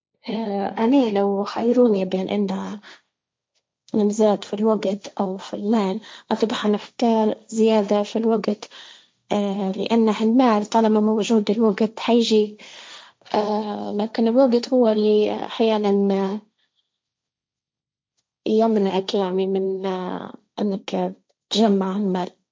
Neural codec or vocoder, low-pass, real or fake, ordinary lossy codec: codec, 16 kHz, 1.1 kbps, Voila-Tokenizer; none; fake; none